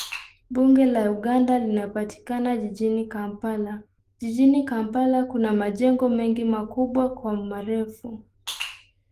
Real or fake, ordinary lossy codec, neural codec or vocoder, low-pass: fake; Opus, 24 kbps; autoencoder, 48 kHz, 128 numbers a frame, DAC-VAE, trained on Japanese speech; 14.4 kHz